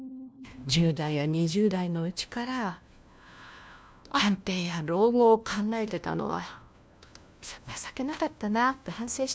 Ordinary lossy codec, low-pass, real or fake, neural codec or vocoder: none; none; fake; codec, 16 kHz, 1 kbps, FunCodec, trained on LibriTTS, 50 frames a second